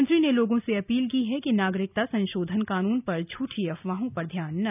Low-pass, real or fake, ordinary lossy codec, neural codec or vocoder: 3.6 kHz; real; none; none